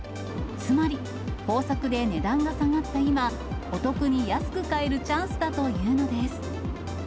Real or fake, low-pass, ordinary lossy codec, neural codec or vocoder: real; none; none; none